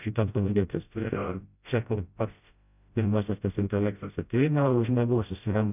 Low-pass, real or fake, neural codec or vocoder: 3.6 kHz; fake; codec, 16 kHz, 0.5 kbps, FreqCodec, smaller model